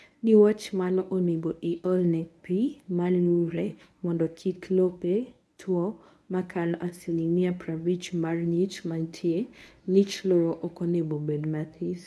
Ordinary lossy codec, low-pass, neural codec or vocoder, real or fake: none; none; codec, 24 kHz, 0.9 kbps, WavTokenizer, medium speech release version 1; fake